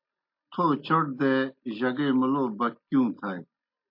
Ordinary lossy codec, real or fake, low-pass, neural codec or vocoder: MP3, 32 kbps; real; 5.4 kHz; none